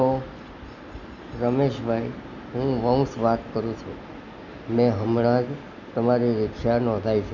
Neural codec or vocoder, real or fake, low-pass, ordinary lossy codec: none; real; 7.2 kHz; none